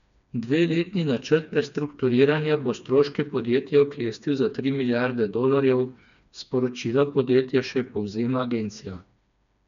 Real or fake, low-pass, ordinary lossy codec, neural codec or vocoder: fake; 7.2 kHz; none; codec, 16 kHz, 2 kbps, FreqCodec, smaller model